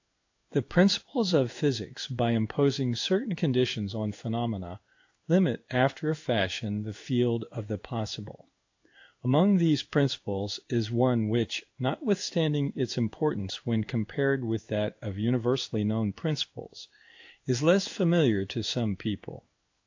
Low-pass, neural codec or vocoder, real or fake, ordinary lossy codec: 7.2 kHz; codec, 16 kHz in and 24 kHz out, 1 kbps, XY-Tokenizer; fake; AAC, 48 kbps